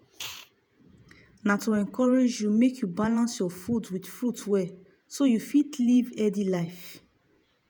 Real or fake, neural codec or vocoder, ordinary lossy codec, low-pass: fake; vocoder, 48 kHz, 128 mel bands, Vocos; none; none